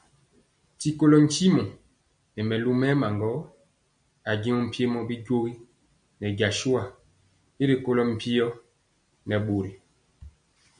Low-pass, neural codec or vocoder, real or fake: 9.9 kHz; none; real